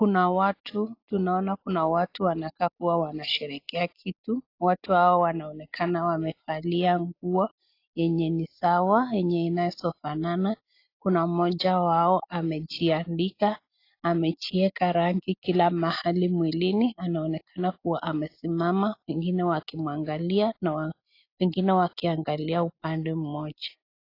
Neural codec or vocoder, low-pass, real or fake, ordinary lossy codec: none; 5.4 kHz; real; AAC, 32 kbps